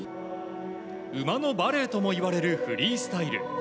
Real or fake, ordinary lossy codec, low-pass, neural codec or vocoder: real; none; none; none